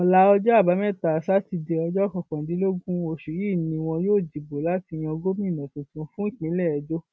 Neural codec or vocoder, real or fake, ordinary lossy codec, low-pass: none; real; none; none